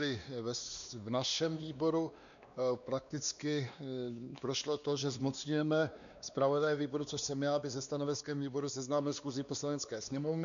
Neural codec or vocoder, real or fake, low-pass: codec, 16 kHz, 2 kbps, X-Codec, WavLM features, trained on Multilingual LibriSpeech; fake; 7.2 kHz